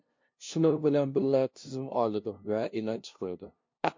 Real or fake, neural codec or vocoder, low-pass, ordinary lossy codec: fake; codec, 16 kHz, 0.5 kbps, FunCodec, trained on LibriTTS, 25 frames a second; 7.2 kHz; MP3, 48 kbps